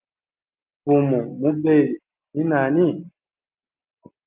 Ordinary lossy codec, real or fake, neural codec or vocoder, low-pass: Opus, 32 kbps; real; none; 3.6 kHz